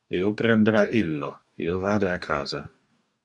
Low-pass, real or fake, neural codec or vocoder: 10.8 kHz; fake; codec, 44.1 kHz, 2.6 kbps, DAC